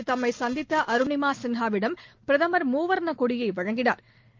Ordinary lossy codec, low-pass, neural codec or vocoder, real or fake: Opus, 24 kbps; 7.2 kHz; none; real